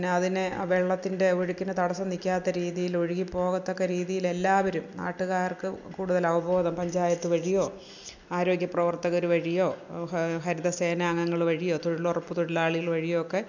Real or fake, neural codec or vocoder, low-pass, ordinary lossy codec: real; none; 7.2 kHz; none